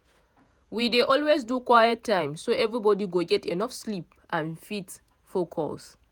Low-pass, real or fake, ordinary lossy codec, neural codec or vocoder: none; fake; none; vocoder, 48 kHz, 128 mel bands, Vocos